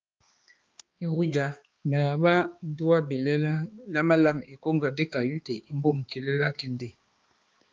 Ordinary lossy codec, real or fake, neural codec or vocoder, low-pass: Opus, 24 kbps; fake; codec, 16 kHz, 2 kbps, X-Codec, HuBERT features, trained on balanced general audio; 7.2 kHz